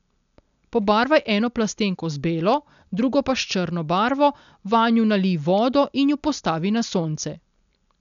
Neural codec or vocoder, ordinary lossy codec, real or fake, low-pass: none; none; real; 7.2 kHz